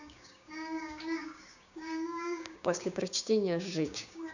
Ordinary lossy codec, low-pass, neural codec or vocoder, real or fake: none; 7.2 kHz; codec, 16 kHz, 6 kbps, DAC; fake